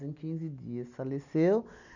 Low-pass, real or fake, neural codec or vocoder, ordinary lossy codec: 7.2 kHz; real; none; none